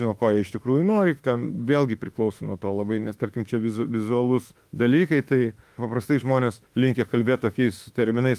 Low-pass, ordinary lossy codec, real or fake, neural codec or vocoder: 14.4 kHz; Opus, 32 kbps; fake; autoencoder, 48 kHz, 32 numbers a frame, DAC-VAE, trained on Japanese speech